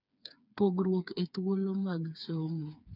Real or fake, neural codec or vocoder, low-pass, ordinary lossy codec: fake; codec, 16 kHz, 4 kbps, FreqCodec, smaller model; 5.4 kHz; none